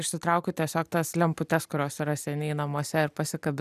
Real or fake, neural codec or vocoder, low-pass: real; none; 14.4 kHz